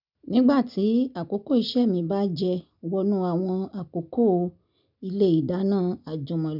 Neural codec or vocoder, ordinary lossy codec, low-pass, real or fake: none; none; 5.4 kHz; real